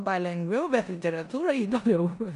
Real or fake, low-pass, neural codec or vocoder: fake; 10.8 kHz; codec, 16 kHz in and 24 kHz out, 0.9 kbps, LongCat-Audio-Codec, four codebook decoder